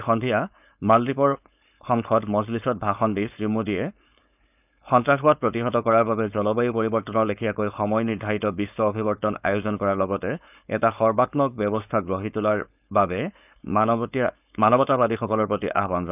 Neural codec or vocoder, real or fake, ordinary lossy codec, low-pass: codec, 16 kHz, 4.8 kbps, FACodec; fake; none; 3.6 kHz